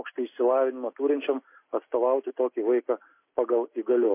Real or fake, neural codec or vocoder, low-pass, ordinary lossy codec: real; none; 3.6 kHz; MP3, 24 kbps